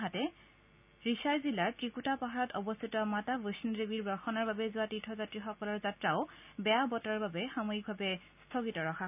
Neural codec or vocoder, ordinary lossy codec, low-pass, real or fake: none; none; 3.6 kHz; real